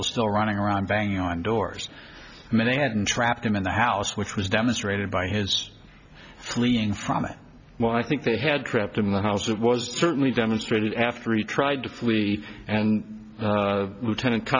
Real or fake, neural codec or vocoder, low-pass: real; none; 7.2 kHz